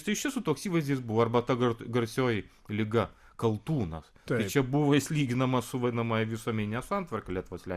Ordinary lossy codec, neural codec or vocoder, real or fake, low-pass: Opus, 64 kbps; none; real; 14.4 kHz